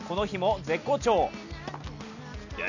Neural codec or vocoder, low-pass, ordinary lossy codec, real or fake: none; 7.2 kHz; none; real